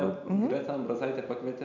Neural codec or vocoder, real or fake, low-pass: none; real; 7.2 kHz